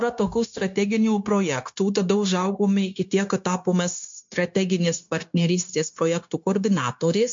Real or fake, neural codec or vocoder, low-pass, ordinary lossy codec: fake; codec, 16 kHz, 0.9 kbps, LongCat-Audio-Codec; 7.2 kHz; MP3, 48 kbps